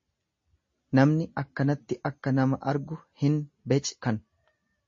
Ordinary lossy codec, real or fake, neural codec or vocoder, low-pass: MP3, 32 kbps; real; none; 7.2 kHz